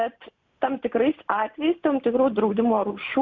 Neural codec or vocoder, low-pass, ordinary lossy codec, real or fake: none; 7.2 kHz; Opus, 64 kbps; real